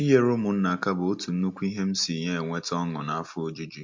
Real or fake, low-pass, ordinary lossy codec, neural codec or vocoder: real; 7.2 kHz; MP3, 48 kbps; none